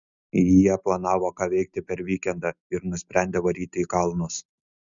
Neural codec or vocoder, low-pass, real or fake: none; 7.2 kHz; real